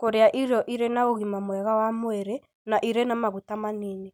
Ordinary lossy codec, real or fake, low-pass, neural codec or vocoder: none; real; none; none